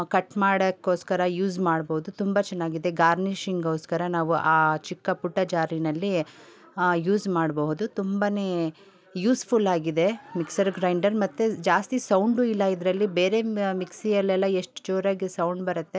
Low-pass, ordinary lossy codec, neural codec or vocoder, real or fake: none; none; none; real